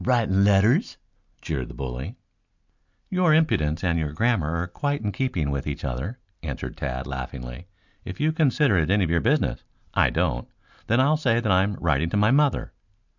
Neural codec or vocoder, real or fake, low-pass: none; real; 7.2 kHz